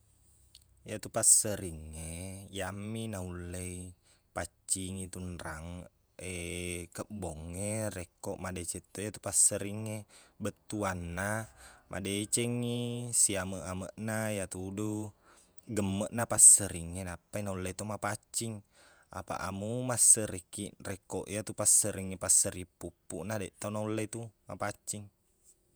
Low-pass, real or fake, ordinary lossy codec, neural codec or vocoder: none; fake; none; vocoder, 48 kHz, 128 mel bands, Vocos